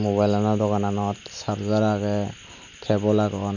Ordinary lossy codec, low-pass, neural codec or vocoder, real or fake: none; 7.2 kHz; none; real